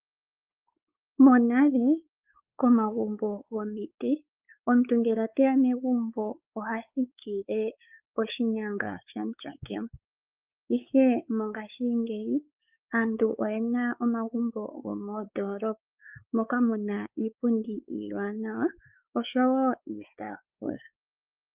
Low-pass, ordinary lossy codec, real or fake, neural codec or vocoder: 3.6 kHz; Opus, 24 kbps; fake; codec, 16 kHz, 4 kbps, X-Codec, WavLM features, trained on Multilingual LibriSpeech